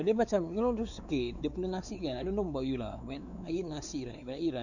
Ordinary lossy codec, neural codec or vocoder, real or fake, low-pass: none; codec, 16 kHz, 4 kbps, X-Codec, WavLM features, trained on Multilingual LibriSpeech; fake; 7.2 kHz